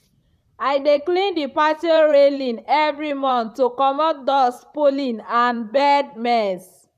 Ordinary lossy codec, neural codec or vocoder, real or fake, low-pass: none; vocoder, 44.1 kHz, 128 mel bands, Pupu-Vocoder; fake; 14.4 kHz